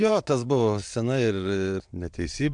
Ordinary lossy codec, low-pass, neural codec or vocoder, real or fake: MP3, 64 kbps; 9.9 kHz; vocoder, 22.05 kHz, 80 mel bands, WaveNeXt; fake